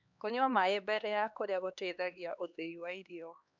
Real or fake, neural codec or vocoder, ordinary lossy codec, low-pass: fake; codec, 16 kHz, 2 kbps, X-Codec, HuBERT features, trained on LibriSpeech; none; 7.2 kHz